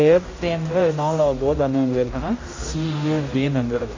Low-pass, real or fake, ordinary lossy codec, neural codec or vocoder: 7.2 kHz; fake; AAC, 32 kbps; codec, 16 kHz, 0.5 kbps, X-Codec, HuBERT features, trained on general audio